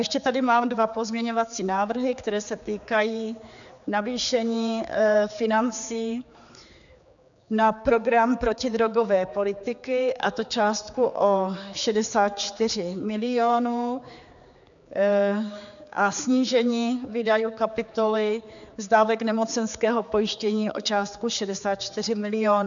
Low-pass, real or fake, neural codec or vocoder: 7.2 kHz; fake; codec, 16 kHz, 4 kbps, X-Codec, HuBERT features, trained on general audio